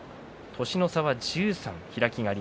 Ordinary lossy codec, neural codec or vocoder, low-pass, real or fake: none; none; none; real